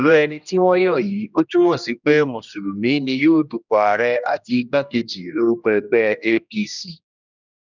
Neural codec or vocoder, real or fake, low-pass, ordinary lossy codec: codec, 16 kHz, 1 kbps, X-Codec, HuBERT features, trained on general audio; fake; 7.2 kHz; none